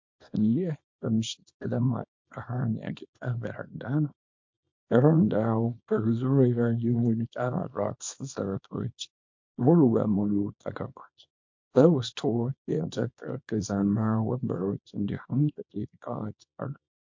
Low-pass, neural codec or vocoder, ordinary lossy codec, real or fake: 7.2 kHz; codec, 24 kHz, 0.9 kbps, WavTokenizer, small release; MP3, 48 kbps; fake